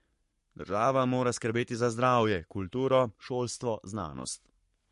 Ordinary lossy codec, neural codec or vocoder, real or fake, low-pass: MP3, 48 kbps; codec, 44.1 kHz, 7.8 kbps, Pupu-Codec; fake; 14.4 kHz